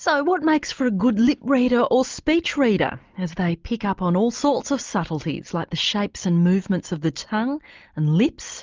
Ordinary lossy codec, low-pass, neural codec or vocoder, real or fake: Opus, 24 kbps; 7.2 kHz; none; real